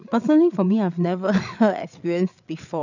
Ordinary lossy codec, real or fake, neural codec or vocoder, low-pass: none; fake; codec, 16 kHz, 8 kbps, FreqCodec, larger model; 7.2 kHz